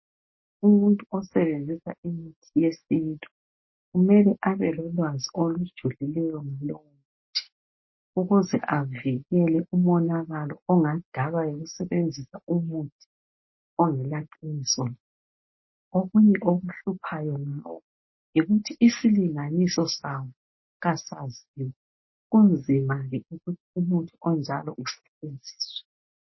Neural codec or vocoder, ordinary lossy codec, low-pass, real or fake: none; MP3, 24 kbps; 7.2 kHz; real